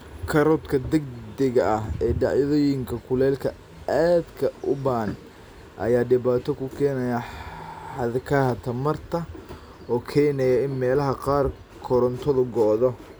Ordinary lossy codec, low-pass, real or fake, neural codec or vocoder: none; none; real; none